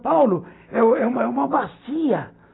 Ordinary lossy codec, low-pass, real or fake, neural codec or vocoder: AAC, 16 kbps; 7.2 kHz; real; none